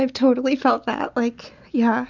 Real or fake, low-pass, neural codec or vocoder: real; 7.2 kHz; none